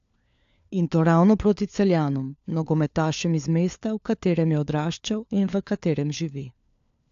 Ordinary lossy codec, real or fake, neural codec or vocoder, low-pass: AAC, 64 kbps; fake; codec, 16 kHz, 4 kbps, FunCodec, trained on LibriTTS, 50 frames a second; 7.2 kHz